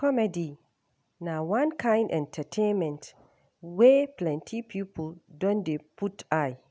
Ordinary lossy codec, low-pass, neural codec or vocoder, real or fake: none; none; none; real